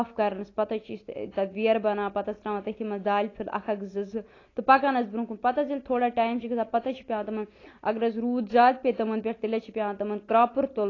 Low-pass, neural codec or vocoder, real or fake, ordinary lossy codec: 7.2 kHz; none; real; AAC, 32 kbps